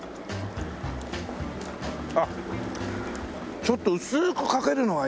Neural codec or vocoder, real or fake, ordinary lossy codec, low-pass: none; real; none; none